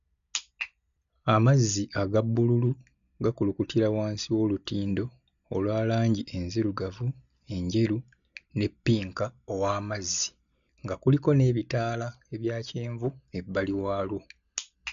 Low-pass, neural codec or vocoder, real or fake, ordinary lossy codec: 7.2 kHz; none; real; none